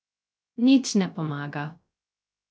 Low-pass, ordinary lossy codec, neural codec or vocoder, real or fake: none; none; codec, 16 kHz, 0.3 kbps, FocalCodec; fake